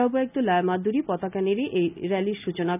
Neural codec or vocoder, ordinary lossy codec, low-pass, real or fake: none; none; 3.6 kHz; real